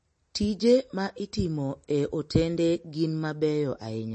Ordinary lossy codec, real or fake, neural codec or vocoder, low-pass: MP3, 32 kbps; real; none; 9.9 kHz